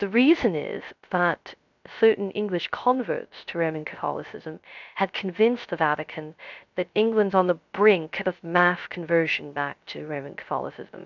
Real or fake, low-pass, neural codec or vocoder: fake; 7.2 kHz; codec, 16 kHz, 0.3 kbps, FocalCodec